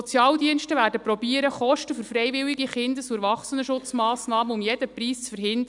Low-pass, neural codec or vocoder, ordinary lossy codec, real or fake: 10.8 kHz; none; none; real